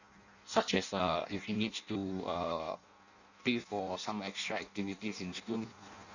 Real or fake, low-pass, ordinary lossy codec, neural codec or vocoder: fake; 7.2 kHz; none; codec, 16 kHz in and 24 kHz out, 0.6 kbps, FireRedTTS-2 codec